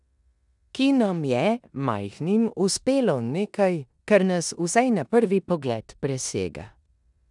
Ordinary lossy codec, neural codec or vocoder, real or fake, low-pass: none; codec, 16 kHz in and 24 kHz out, 0.9 kbps, LongCat-Audio-Codec, four codebook decoder; fake; 10.8 kHz